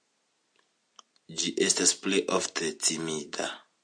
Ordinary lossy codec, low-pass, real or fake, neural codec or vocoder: AAC, 64 kbps; 9.9 kHz; real; none